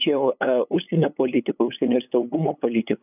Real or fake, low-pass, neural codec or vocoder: fake; 3.6 kHz; codec, 16 kHz, 8 kbps, FunCodec, trained on LibriTTS, 25 frames a second